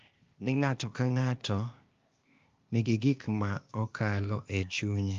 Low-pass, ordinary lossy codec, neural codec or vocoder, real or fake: 7.2 kHz; Opus, 32 kbps; codec, 16 kHz, 0.8 kbps, ZipCodec; fake